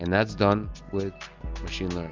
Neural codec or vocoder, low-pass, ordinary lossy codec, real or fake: none; 7.2 kHz; Opus, 32 kbps; real